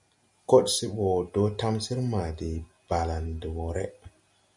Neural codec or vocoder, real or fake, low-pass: none; real; 10.8 kHz